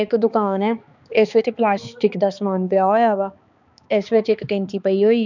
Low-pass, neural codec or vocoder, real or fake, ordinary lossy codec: 7.2 kHz; codec, 16 kHz, 2 kbps, X-Codec, HuBERT features, trained on balanced general audio; fake; none